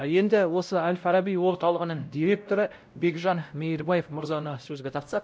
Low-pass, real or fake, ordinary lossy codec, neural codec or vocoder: none; fake; none; codec, 16 kHz, 0.5 kbps, X-Codec, WavLM features, trained on Multilingual LibriSpeech